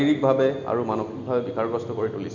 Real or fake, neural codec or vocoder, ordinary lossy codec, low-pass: real; none; none; 7.2 kHz